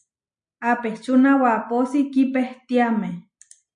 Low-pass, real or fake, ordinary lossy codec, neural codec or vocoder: 9.9 kHz; real; MP3, 64 kbps; none